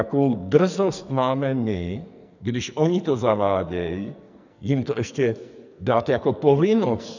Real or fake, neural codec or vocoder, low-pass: fake; codec, 44.1 kHz, 2.6 kbps, SNAC; 7.2 kHz